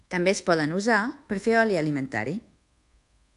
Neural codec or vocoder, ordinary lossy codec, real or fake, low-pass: codec, 24 kHz, 1.2 kbps, DualCodec; AAC, 64 kbps; fake; 10.8 kHz